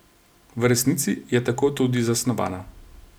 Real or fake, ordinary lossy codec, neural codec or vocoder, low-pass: real; none; none; none